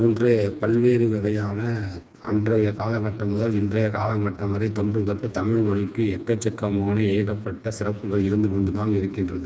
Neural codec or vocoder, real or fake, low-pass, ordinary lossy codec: codec, 16 kHz, 2 kbps, FreqCodec, smaller model; fake; none; none